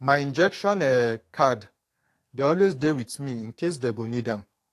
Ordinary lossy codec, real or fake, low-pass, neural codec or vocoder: AAC, 64 kbps; fake; 14.4 kHz; codec, 44.1 kHz, 2.6 kbps, SNAC